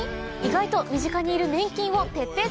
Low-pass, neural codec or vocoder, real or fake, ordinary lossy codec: none; none; real; none